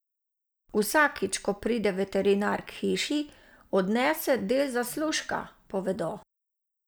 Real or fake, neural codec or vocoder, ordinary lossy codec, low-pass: real; none; none; none